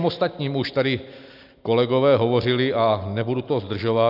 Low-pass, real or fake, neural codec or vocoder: 5.4 kHz; real; none